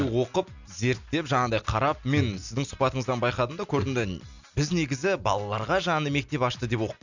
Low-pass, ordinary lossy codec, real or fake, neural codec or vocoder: 7.2 kHz; none; real; none